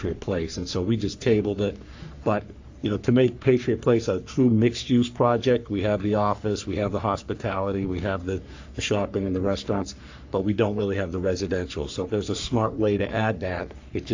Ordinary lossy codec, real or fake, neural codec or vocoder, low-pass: AAC, 48 kbps; fake; codec, 44.1 kHz, 3.4 kbps, Pupu-Codec; 7.2 kHz